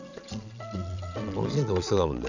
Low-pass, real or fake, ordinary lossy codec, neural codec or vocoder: 7.2 kHz; fake; none; vocoder, 22.05 kHz, 80 mel bands, Vocos